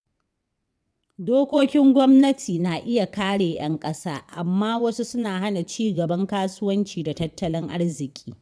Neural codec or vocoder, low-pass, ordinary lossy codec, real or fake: vocoder, 22.05 kHz, 80 mel bands, WaveNeXt; none; none; fake